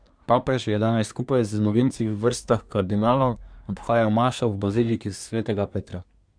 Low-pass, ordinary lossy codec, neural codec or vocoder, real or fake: 9.9 kHz; none; codec, 24 kHz, 1 kbps, SNAC; fake